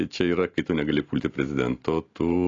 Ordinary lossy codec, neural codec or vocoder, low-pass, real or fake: AAC, 32 kbps; none; 7.2 kHz; real